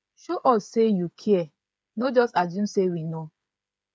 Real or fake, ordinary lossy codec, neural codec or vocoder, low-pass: fake; none; codec, 16 kHz, 8 kbps, FreqCodec, smaller model; none